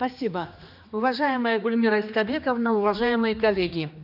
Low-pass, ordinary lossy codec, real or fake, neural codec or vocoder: 5.4 kHz; none; fake; codec, 16 kHz, 2 kbps, X-Codec, HuBERT features, trained on general audio